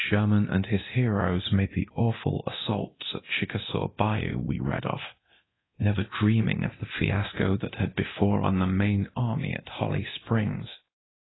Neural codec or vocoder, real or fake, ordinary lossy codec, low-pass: codec, 24 kHz, 0.9 kbps, DualCodec; fake; AAC, 16 kbps; 7.2 kHz